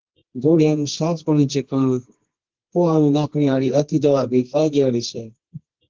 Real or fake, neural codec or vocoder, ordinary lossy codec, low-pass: fake; codec, 24 kHz, 0.9 kbps, WavTokenizer, medium music audio release; Opus, 16 kbps; 7.2 kHz